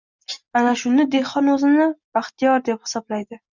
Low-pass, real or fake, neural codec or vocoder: 7.2 kHz; real; none